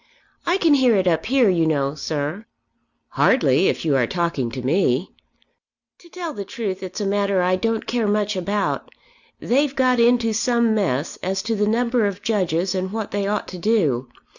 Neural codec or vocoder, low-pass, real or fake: none; 7.2 kHz; real